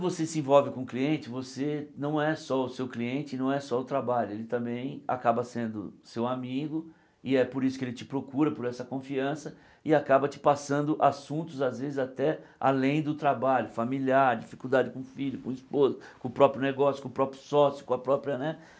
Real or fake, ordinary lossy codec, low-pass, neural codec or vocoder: real; none; none; none